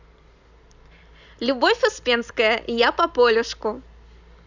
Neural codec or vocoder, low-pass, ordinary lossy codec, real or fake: none; 7.2 kHz; none; real